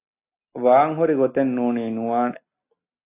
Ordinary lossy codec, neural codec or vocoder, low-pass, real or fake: AAC, 24 kbps; none; 3.6 kHz; real